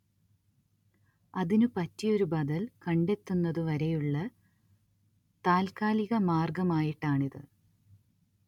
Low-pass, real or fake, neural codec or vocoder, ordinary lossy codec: 19.8 kHz; real; none; none